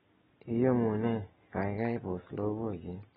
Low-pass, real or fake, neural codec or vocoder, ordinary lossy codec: 19.8 kHz; real; none; AAC, 16 kbps